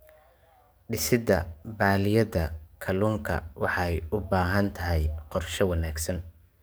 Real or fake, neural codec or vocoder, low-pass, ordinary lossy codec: fake; codec, 44.1 kHz, 7.8 kbps, DAC; none; none